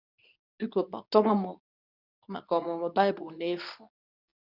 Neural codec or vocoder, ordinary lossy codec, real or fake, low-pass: codec, 24 kHz, 0.9 kbps, WavTokenizer, medium speech release version 2; none; fake; 5.4 kHz